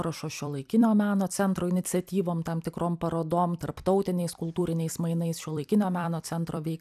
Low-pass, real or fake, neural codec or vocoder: 14.4 kHz; fake; vocoder, 44.1 kHz, 128 mel bands every 256 samples, BigVGAN v2